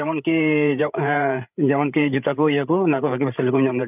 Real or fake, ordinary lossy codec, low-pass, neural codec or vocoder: fake; none; 3.6 kHz; codec, 16 kHz, 8 kbps, FreqCodec, larger model